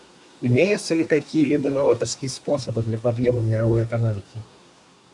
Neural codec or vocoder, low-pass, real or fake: codec, 24 kHz, 1 kbps, SNAC; 10.8 kHz; fake